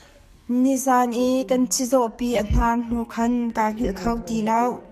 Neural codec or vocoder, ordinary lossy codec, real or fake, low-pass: codec, 44.1 kHz, 2.6 kbps, SNAC; MP3, 96 kbps; fake; 14.4 kHz